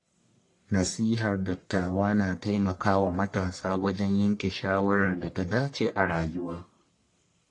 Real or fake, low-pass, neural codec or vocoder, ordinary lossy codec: fake; 10.8 kHz; codec, 44.1 kHz, 1.7 kbps, Pupu-Codec; AAC, 48 kbps